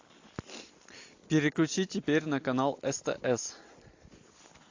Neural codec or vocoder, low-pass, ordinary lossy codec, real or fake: none; 7.2 kHz; AAC, 48 kbps; real